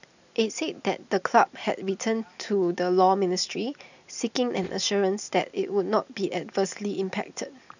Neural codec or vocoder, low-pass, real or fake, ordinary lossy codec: none; 7.2 kHz; real; none